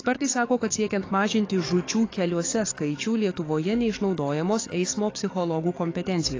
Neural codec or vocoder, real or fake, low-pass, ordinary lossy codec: codec, 44.1 kHz, 7.8 kbps, DAC; fake; 7.2 kHz; AAC, 32 kbps